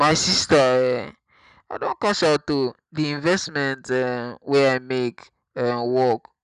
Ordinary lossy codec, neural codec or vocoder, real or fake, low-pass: none; none; real; 10.8 kHz